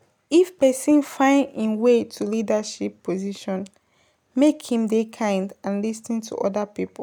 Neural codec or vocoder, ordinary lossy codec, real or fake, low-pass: none; none; real; 19.8 kHz